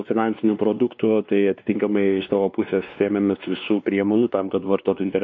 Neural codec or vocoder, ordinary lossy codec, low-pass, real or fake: codec, 16 kHz, 2 kbps, X-Codec, WavLM features, trained on Multilingual LibriSpeech; MP3, 48 kbps; 7.2 kHz; fake